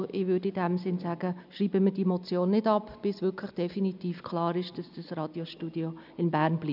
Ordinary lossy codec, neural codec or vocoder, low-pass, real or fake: none; none; 5.4 kHz; real